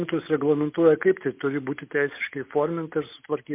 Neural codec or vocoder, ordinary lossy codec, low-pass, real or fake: none; MP3, 32 kbps; 3.6 kHz; real